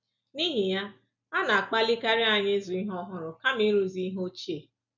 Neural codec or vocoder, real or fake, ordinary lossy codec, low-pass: none; real; none; 7.2 kHz